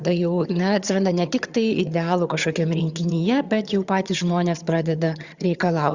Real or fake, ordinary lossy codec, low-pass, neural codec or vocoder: fake; Opus, 64 kbps; 7.2 kHz; vocoder, 22.05 kHz, 80 mel bands, HiFi-GAN